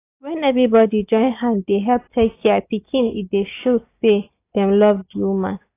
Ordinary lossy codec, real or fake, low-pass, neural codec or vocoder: AAC, 24 kbps; real; 3.6 kHz; none